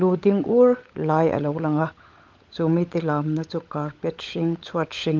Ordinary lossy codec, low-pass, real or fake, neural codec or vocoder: Opus, 24 kbps; 7.2 kHz; fake; codec, 16 kHz, 16 kbps, FunCodec, trained on LibriTTS, 50 frames a second